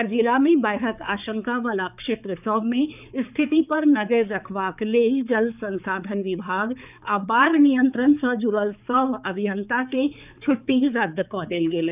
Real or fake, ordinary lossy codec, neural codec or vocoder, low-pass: fake; none; codec, 16 kHz, 4 kbps, X-Codec, HuBERT features, trained on balanced general audio; 3.6 kHz